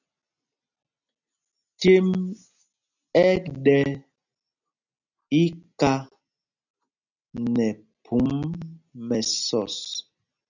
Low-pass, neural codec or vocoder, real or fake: 7.2 kHz; none; real